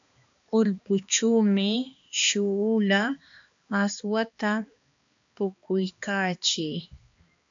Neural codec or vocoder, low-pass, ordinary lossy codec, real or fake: codec, 16 kHz, 2 kbps, X-Codec, HuBERT features, trained on balanced general audio; 7.2 kHz; AAC, 64 kbps; fake